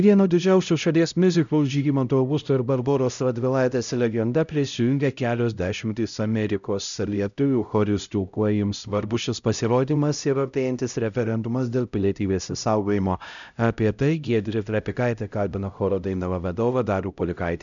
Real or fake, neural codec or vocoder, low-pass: fake; codec, 16 kHz, 0.5 kbps, X-Codec, HuBERT features, trained on LibriSpeech; 7.2 kHz